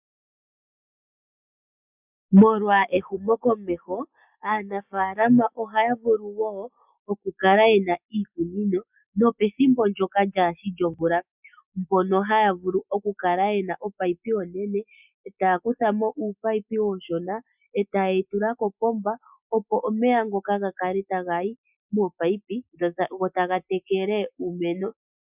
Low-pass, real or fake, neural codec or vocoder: 3.6 kHz; real; none